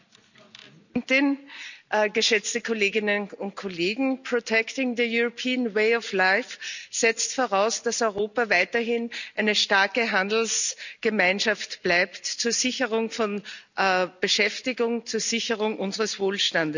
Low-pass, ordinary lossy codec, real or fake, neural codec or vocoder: 7.2 kHz; none; real; none